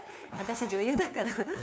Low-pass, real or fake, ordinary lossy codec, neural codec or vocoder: none; fake; none; codec, 16 kHz, 2 kbps, FunCodec, trained on LibriTTS, 25 frames a second